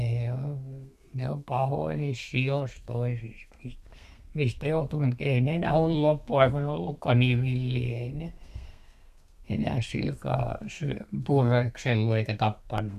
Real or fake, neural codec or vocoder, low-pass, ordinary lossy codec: fake; codec, 32 kHz, 1.9 kbps, SNAC; 14.4 kHz; none